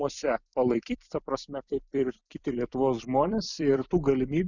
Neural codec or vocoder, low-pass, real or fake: vocoder, 44.1 kHz, 128 mel bands every 256 samples, BigVGAN v2; 7.2 kHz; fake